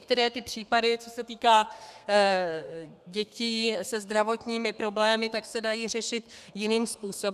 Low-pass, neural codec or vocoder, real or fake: 14.4 kHz; codec, 32 kHz, 1.9 kbps, SNAC; fake